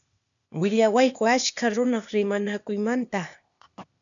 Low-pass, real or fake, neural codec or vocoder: 7.2 kHz; fake; codec, 16 kHz, 0.8 kbps, ZipCodec